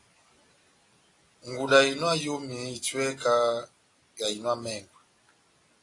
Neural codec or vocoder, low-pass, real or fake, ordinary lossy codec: none; 10.8 kHz; real; AAC, 32 kbps